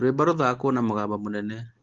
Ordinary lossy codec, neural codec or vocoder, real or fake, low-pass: Opus, 16 kbps; none; real; 7.2 kHz